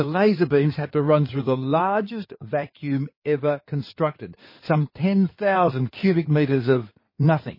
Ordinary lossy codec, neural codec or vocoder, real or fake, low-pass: MP3, 24 kbps; codec, 16 kHz in and 24 kHz out, 2.2 kbps, FireRedTTS-2 codec; fake; 5.4 kHz